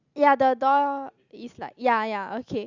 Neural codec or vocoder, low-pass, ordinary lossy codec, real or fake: none; 7.2 kHz; Opus, 64 kbps; real